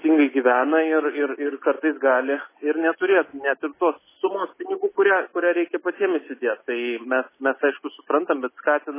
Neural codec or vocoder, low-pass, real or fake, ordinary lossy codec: none; 3.6 kHz; real; MP3, 16 kbps